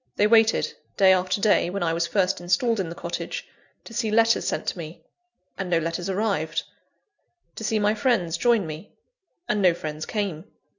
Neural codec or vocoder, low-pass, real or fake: none; 7.2 kHz; real